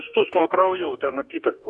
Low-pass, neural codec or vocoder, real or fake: 10.8 kHz; codec, 44.1 kHz, 2.6 kbps, DAC; fake